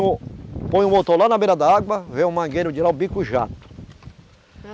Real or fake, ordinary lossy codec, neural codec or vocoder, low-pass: real; none; none; none